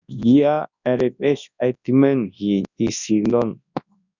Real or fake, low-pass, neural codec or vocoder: fake; 7.2 kHz; codec, 24 kHz, 0.9 kbps, WavTokenizer, large speech release